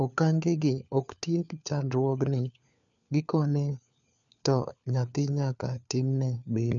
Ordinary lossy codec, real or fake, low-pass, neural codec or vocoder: none; fake; 7.2 kHz; codec, 16 kHz, 4 kbps, FunCodec, trained on LibriTTS, 50 frames a second